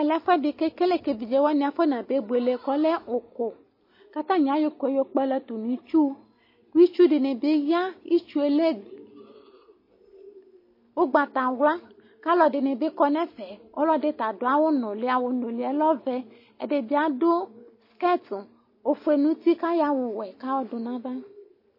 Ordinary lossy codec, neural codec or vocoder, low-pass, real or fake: MP3, 24 kbps; none; 5.4 kHz; real